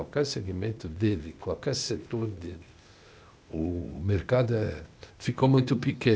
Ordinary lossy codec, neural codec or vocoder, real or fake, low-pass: none; codec, 16 kHz, 0.8 kbps, ZipCodec; fake; none